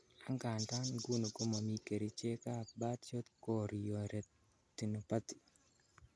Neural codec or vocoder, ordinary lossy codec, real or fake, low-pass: none; none; real; none